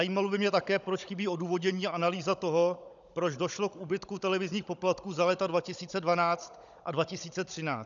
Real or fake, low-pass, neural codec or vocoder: fake; 7.2 kHz; codec, 16 kHz, 16 kbps, FunCodec, trained on Chinese and English, 50 frames a second